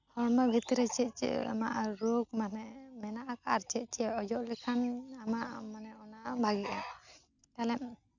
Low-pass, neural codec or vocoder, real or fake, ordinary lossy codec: 7.2 kHz; none; real; none